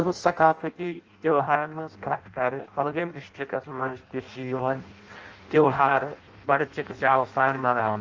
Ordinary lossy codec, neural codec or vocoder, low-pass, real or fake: Opus, 24 kbps; codec, 16 kHz in and 24 kHz out, 0.6 kbps, FireRedTTS-2 codec; 7.2 kHz; fake